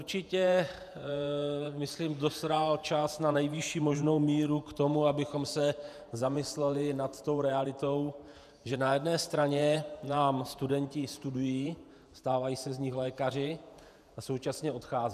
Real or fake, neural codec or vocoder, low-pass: fake; vocoder, 48 kHz, 128 mel bands, Vocos; 14.4 kHz